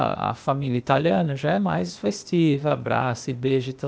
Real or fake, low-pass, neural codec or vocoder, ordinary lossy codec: fake; none; codec, 16 kHz, 0.8 kbps, ZipCodec; none